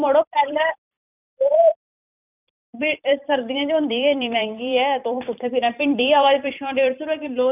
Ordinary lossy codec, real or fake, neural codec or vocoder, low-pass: none; fake; vocoder, 44.1 kHz, 128 mel bands every 256 samples, BigVGAN v2; 3.6 kHz